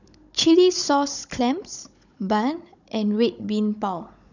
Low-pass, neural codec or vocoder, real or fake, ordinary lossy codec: 7.2 kHz; codec, 16 kHz, 16 kbps, FunCodec, trained on Chinese and English, 50 frames a second; fake; none